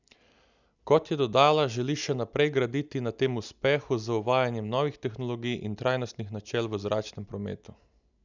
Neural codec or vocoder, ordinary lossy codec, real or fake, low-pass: none; none; real; 7.2 kHz